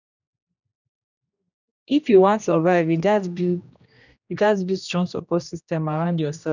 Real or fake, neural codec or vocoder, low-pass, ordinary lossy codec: fake; codec, 16 kHz, 1 kbps, X-Codec, HuBERT features, trained on general audio; 7.2 kHz; none